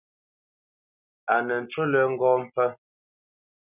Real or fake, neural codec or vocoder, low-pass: real; none; 3.6 kHz